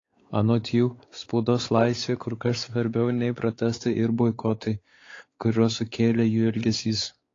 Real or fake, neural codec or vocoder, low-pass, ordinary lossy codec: fake; codec, 16 kHz, 4 kbps, X-Codec, WavLM features, trained on Multilingual LibriSpeech; 7.2 kHz; AAC, 32 kbps